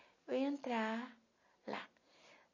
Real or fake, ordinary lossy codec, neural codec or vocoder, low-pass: real; MP3, 32 kbps; none; 7.2 kHz